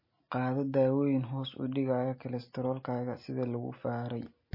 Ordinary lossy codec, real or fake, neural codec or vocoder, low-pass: MP3, 24 kbps; real; none; 5.4 kHz